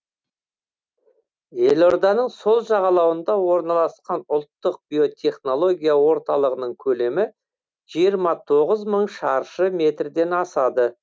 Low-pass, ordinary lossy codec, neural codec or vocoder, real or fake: none; none; none; real